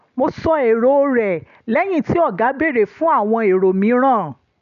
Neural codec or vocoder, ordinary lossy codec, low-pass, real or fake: none; none; 7.2 kHz; real